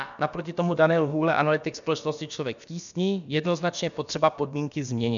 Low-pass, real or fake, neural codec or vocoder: 7.2 kHz; fake; codec, 16 kHz, about 1 kbps, DyCAST, with the encoder's durations